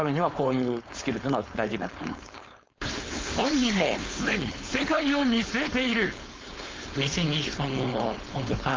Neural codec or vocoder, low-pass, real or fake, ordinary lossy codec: codec, 16 kHz, 4.8 kbps, FACodec; 7.2 kHz; fake; Opus, 32 kbps